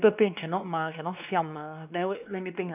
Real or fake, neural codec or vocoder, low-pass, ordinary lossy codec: fake; codec, 16 kHz, 2 kbps, X-Codec, WavLM features, trained on Multilingual LibriSpeech; 3.6 kHz; none